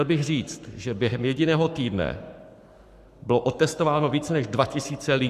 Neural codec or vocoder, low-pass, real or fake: codec, 44.1 kHz, 7.8 kbps, Pupu-Codec; 14.4 kHz; fake